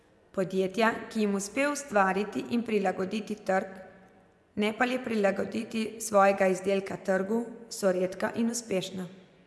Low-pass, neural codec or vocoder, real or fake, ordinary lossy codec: none; vocoder, 24 kHz, 100 mel bands, Vocos; fake; none